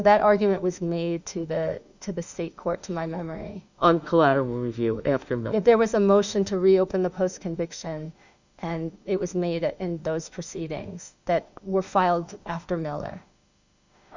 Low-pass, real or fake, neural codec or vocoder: 7.2 kHz; fake; autoencoder, 48 kHz, 32 numbers a frame, DAC-VAE, trained on Japanese speech